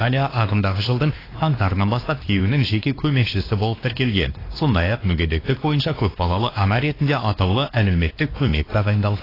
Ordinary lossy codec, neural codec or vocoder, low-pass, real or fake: AAC, 24 kbps; codec, 16 kHz, 2 kbps, X-Codec, WavLM features, trained on Multilingual LibriSpeech; 5.4 kHz; fake